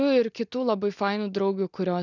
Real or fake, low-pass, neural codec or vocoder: real; 7.2 kHz; none